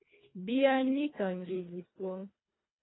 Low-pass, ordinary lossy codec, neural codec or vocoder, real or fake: 7.2 kHz; AAC, 16 kbps; codec, 24 kHz, 1.5 kbps, HILCodec; fake